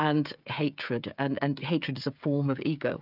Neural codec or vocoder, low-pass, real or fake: codec, 16 kHz, 16 kbps, FreqCodec, smaller model; 5.4 kHz; fake